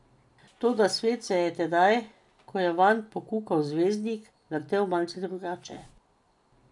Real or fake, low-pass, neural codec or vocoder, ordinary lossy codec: real; 10.8 kHz; none; none